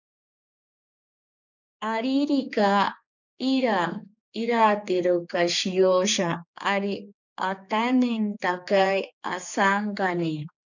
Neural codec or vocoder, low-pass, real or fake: codec, 16 kHz, 4 kbps, X-Codec, HuBERT features, trained on general audio; 7.2 kHz; fake